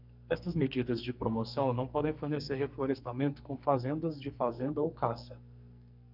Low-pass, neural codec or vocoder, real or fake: 5.4 kHz; codec, 44.1 kHz, 2.6 kbps, SNAC; fake